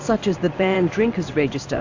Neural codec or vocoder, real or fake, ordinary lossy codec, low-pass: codec, 16 kHz in and 24 kHz out, 1 kbps, XY-Tokenizer; fake; AAC, 48 kbps; 7.2 kHz